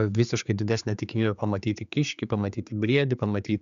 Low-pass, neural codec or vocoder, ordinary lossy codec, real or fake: 7.2 kHz; codec, 16 kHz, 4 kbps, X-Codec, HuBERT features, trained on general audio; MP3, 96 kbps; fake